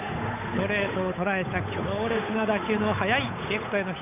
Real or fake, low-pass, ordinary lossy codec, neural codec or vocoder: fake; 3.6 kHz; none; codec, 16 kHz, 8 kbps, FunCodec, trained on Chinese and English, 25 frames a second